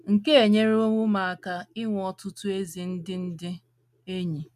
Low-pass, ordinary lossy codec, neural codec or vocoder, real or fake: 14.4 kHz; none; none; real